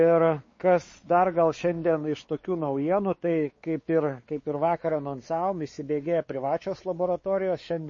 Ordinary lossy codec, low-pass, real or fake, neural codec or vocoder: MP3, 32 kbps; 7.2 kHz; fake; codec, 16 kHz, 4 kbps, X-Codec, WavLM features, trained on Multilingual LibriSpeech